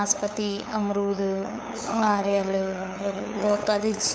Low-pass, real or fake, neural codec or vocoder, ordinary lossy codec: none; fake; codec, 16 kHz, 8 kbps, FunCodec, trained on LibriTTS, 25 frames a second; none